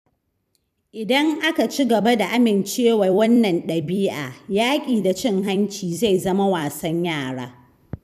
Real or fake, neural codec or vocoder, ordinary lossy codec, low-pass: fake; vocoder, 44.1 kHz, 128 mel bands every 256 samples, BigVGAN v2; none; 14.4 kHz